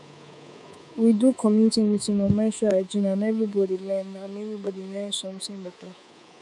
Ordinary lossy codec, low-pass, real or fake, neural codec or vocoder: none; none; fake; codec, 24 kHz, 3.1 kbps, DualCodec